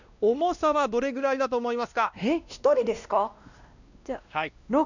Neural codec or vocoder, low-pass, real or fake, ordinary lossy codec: codec, 16 kHz, 1 kbps, X-Codec, WavLM features, trained on Multilingual LibriSpeech; 7.2 kHz; fake; none